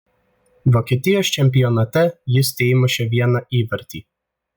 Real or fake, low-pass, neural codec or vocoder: real; 19.8 kHz; none